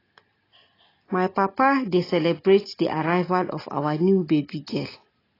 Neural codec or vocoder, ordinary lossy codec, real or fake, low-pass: none; AAC, 24 kbps; real; 5.4 kHz